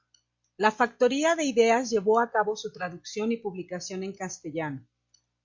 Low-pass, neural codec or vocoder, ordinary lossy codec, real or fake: 7.2 kHz; none; MP3, 48 kbps; real